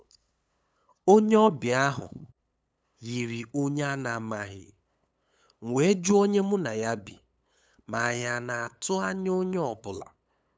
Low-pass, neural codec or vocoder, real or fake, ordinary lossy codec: none; codec, 16 kHz, 8 kbps, FunCodec, trained on LibriTTS, 25 frames a second; fake; none